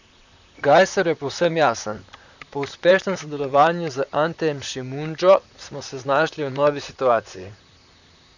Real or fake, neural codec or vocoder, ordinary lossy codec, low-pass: fake; vocoder, 44.1 kHz, 128 mel bands, Pupu-Vocoder; none; 7.2 kHz